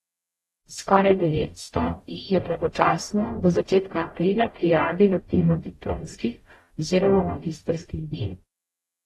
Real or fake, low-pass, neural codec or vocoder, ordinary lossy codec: fake; 19.8 kHz; codec, 44.1 kHz, 0.9 kbps, DAC; AAC, 32 kbps